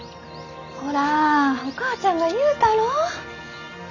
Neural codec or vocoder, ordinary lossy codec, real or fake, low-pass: none; none; real; 7.2 kHz